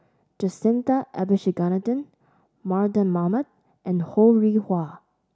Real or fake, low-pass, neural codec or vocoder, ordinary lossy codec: real; none; none; none